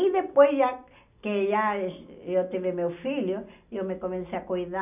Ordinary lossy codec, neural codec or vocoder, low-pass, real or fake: none; none; 3.6 kHz; real